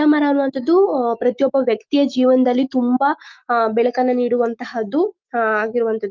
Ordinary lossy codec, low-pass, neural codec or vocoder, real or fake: Opus, 24 kbps; 7.2 kHz; autoencoder, 48 kHz, 128 numbers a frame, DAC-VAE, trained on Japanese speech; fake